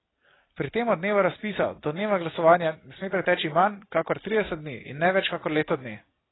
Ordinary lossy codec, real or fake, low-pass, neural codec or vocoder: AAC, 16 kbps; real; 7.2 kHz; none